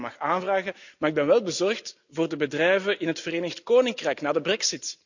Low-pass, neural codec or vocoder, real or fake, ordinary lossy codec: 7.2 kHz; none; real; none